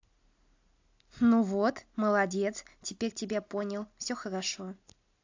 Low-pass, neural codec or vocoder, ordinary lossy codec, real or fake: 7.2 kHz; none; AAC, 48 kbps; real